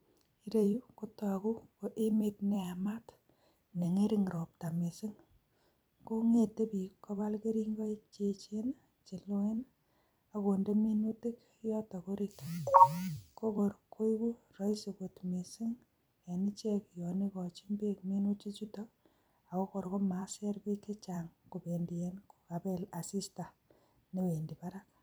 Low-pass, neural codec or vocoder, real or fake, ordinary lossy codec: none; vocoder, 44.1 kHz, 128 mel bands every 256 samples, BigVGAN v2; fake; none